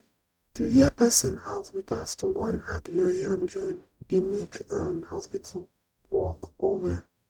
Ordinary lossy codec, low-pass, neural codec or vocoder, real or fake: none; 19.8 kHz; codec, 44.1 kHz, 0.9 kbps, DAC; fake